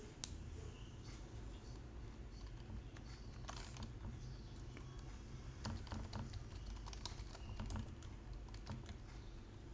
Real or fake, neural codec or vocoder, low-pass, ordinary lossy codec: real; none; none; none